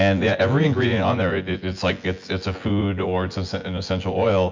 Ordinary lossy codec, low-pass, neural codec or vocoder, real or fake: MP3, 64 kbps; 7.2 kHz; vocoder, 24 kHz, 100 mel bands, Vocos; fake